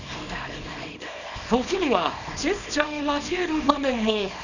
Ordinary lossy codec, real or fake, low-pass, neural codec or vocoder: none; fake; 7.2 kHz; codec, 24 kHz, 0.9 kbps, WavTokenizer, small release